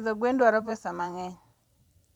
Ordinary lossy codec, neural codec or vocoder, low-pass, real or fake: none; vocoder, 44.1 kHz, 128 mel bands every 512 samples, BigVGAN v2; 19.8 kHz; fake